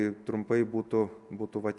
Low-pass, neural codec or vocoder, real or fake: 10.8 kHz; none; real